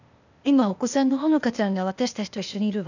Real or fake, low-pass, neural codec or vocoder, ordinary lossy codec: fake; 7.2 kHz; codec, 16 kHz, 0.8 kbps, ZipCodec; none